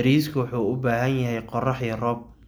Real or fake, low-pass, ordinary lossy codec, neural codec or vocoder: real; none; none; none